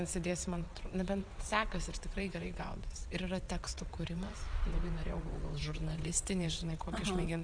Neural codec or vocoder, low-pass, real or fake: vocoder, 22.05 kHz, 80 mel bands, WaveNeXt; 9.9 kHz; fake